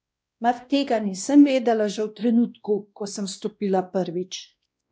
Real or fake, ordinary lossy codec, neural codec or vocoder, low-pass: fake; none; codec, 16 kHz, 1 kbps, X-Codec, WavLM features, trained on Multilingual LibriSpeech; none